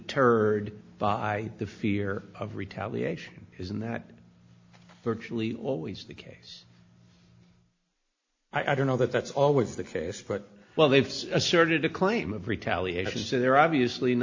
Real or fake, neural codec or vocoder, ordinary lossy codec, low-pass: real; none; AAC, 48 kbps; 7.2 kHz